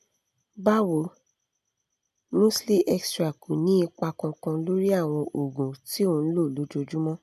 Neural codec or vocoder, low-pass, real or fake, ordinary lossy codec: none; 14.4 kHz; real; none